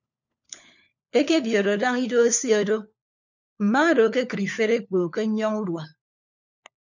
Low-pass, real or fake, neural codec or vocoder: 7.2 kHz; fake; codec, 16 kHz, 4 kbps, FunCodec, trained on LibriTTS, 50 frames a second